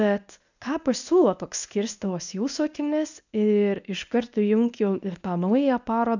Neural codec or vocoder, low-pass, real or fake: codec, 24 kHz, 0.9 kbps, WavTokenizer, medium speech release version 1; 7.2 kHz; fake